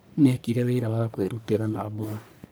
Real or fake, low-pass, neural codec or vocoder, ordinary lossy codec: fake; none; codec, 44.1 kHz, 1.7 kbps, Pupu-Codec; none